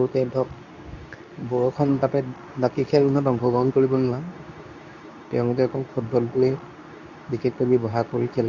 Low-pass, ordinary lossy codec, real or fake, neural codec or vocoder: 7.2 kHz; none; fake; codec, 24 kHz, 0.9 kbps, WavTokenizer, medium speech release version 2